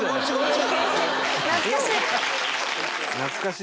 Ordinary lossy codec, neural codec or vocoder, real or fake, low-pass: none; none; real; none